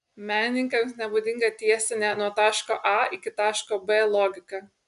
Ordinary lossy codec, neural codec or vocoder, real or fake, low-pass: AAC, 96 kbps; none; real; 10.8 kHz